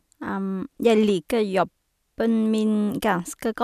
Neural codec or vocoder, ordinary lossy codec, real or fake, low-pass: none; none; real; 14.4 kHz